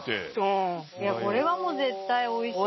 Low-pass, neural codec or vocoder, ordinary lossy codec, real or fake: 7.2 kHz; none; MP3, 24 kbps; real